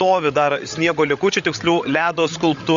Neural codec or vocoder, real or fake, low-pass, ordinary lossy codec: codec, 16 kHz, 16 kbps, FreqCodec, larger model; fake; 7.2 kHz; Opus, 64 kbps